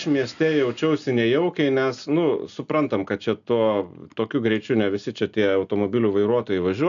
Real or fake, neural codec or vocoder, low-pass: real; none; 7.2 kHz